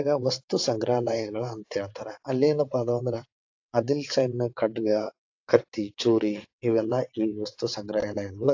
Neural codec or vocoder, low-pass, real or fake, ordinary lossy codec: vocoder, 22.05 kHz, 80 mel bands, WaveNeXt; 7.2 kHz; fake; AAC, 48 kbps